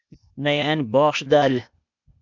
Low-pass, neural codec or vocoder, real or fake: 7.2 kHz; codec, 16 kHz, 0.8 kbps, ZipCodec; fake